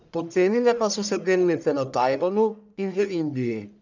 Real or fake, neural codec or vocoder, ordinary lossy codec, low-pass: fake; codec, 44.1 kHz, 1.7 kbps, Pupu-Codec; none; 7.2 kHz